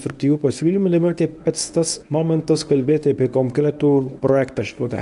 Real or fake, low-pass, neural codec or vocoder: fake; 10.8 kHz; codec, 24 kHz, 0.9 kbps, WavTokenizer, medium speech release version 1